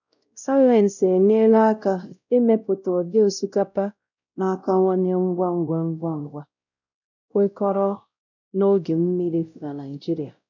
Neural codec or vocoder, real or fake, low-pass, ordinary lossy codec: codec, 16 kHz, 0.5 kbps, X-Codec, WavLM features, trained on Multilingual LibriSpeech; fake; 7.2 kHz; none